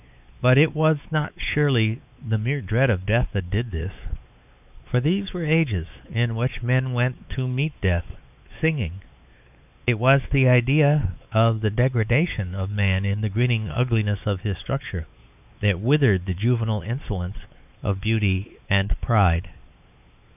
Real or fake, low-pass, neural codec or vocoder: fake; 3.6 kHz; codec, 16 kHz, 4 kbps, X-Codec, WavLM features, trained on Multilingual LibriSpeech